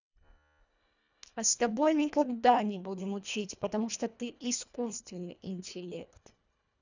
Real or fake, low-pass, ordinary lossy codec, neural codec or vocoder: fake; 7.2 kHz; none; codec, 24 kHz, 1.5 kbps, HILCodec